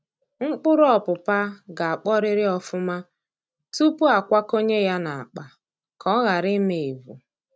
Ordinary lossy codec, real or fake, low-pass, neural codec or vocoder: none; real; none; none